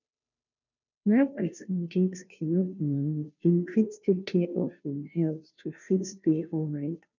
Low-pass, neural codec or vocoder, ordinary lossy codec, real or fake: 7.2 kHz; codec, 16 kHz, 0.5 kbps, FunCodec, trained on Chinese and English, 25 frames a second; none; fake